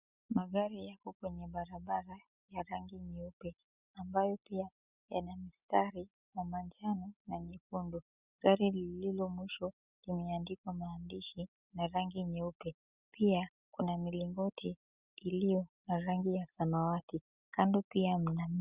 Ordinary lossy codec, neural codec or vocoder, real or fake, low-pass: Opus, 64 kbps; none; real; 3.6 kHz